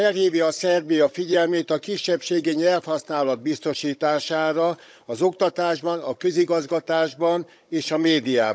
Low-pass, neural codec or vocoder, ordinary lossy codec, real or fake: none; codec, 16 kHz, 16 kbps, FunCodec, trained on Chinese and English, 50 frames a second; none; fake